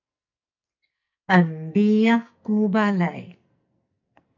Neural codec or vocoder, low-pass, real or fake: codec, 44.1 kHz, 2.6 kbps, SNAC; 7.2 kHz; fake